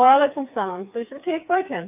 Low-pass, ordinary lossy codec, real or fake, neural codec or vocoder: 3.6 kHz; AAC, 32 kbps; fake; codec, 16 kHz, 4 kbps, FreqCodec, smaller model